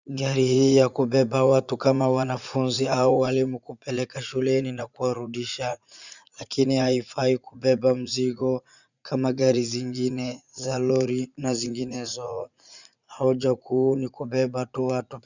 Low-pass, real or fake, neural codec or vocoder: 7.2 kHz; fake; vocoder, 44.1 kHz, 80 mel bands, Vocos